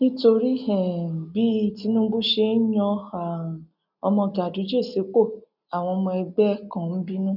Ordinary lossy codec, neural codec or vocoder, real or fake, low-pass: none; none; real; 5.4 kHz